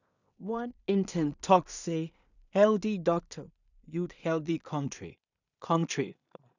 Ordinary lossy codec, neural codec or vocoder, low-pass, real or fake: none; codec, 16 kHz in and 24 kHz out, 0.4 kbps, LongCat-Audio-Codec, two codebook decoder; 7.2 kHz; fake